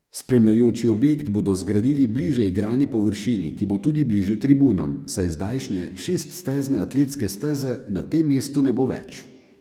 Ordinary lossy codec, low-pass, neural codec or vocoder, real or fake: none; 19.8 kHz; codec, 44.1 kHz, 2.6 kbps, DAC; fake